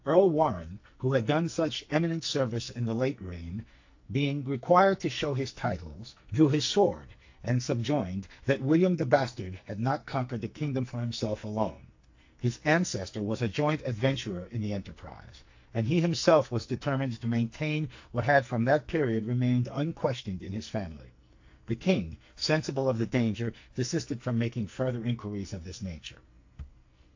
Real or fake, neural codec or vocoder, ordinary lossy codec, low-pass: fake; codec, 44.1 kHz, 2.6 kbps, SNAC; AAC, 48 kbps; 7.2 kHz